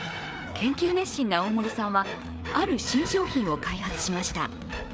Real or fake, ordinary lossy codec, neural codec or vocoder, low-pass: fake; none; codec, 16 kHz, 4 kbps, FreqCodec, larger model; none